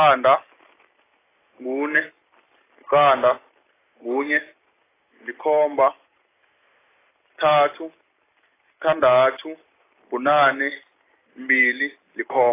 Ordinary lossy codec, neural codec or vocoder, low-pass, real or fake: AAC, 16 kbps; none; 3.6 kHz; real